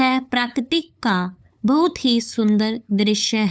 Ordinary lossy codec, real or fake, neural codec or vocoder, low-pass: none; fake; codec, 16 kHz, 8 kbps, FunCodec, trained on LibriTTS, 25 frames a second; none